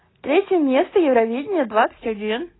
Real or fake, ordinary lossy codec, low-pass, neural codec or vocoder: real; AAC, 16 kbps; 7.2 kHz; none